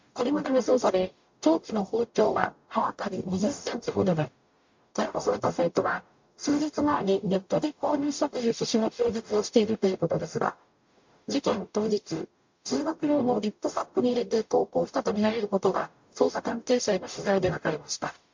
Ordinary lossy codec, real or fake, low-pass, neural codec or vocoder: MP3, 48 kbps; fake; 7.2 kHz; codec, 44.1 kHz, 0.9 kbps, DAC